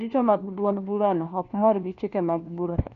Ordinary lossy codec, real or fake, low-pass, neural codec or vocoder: none; fake; 7.2 kHz; codec, 16 kHz, 1 kbps, FunCodec, trained on LibriTTS, 50 frames a second